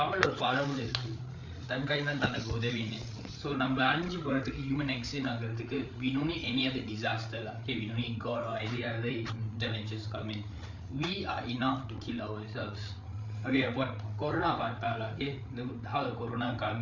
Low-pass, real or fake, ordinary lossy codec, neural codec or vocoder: 7.2 kHz; fake; none; codec, 16 kHz, 8 kbps, FreqCodec, larger model